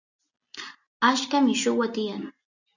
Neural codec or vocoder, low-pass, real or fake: none; 7.2 kHz; real